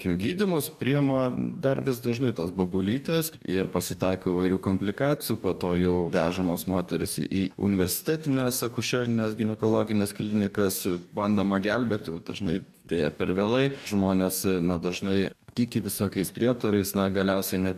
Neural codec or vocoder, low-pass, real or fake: codec, 44.1 kHz, 2.6 kbps, DAC; 14.4 kHz; fake